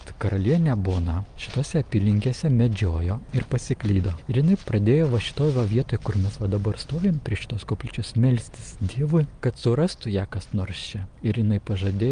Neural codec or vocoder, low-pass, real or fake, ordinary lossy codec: none; 9.9 kHz; real; Opus, 32 kbps